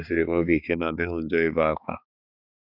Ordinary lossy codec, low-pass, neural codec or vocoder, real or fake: none; 5.4 kHz; codec, 16 kHz, 4 kbps, X-Codec, HuBERT features, trained on balanced general audio; fake